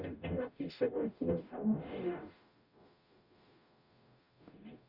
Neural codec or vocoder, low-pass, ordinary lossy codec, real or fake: codec, 44.1 kHz, 0.9 kbps, DAC; 5.4 kHz; none; fake